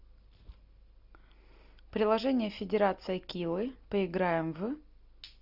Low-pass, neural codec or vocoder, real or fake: 5.4 kHz; none; real